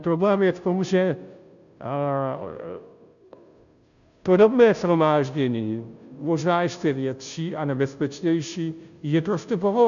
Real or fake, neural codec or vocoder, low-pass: fake; codec, 16 kHz, 0.5 kbps, FunCodec, trained on Chinese and English, 25 frames a second; 7.2 kHz